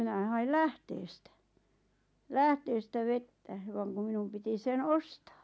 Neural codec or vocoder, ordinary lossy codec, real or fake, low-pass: none; none; real; none